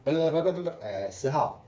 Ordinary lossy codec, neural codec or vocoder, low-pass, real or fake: none; codec, 16 kHz, 4 kbps, FreqCodec, smaller model; none; fake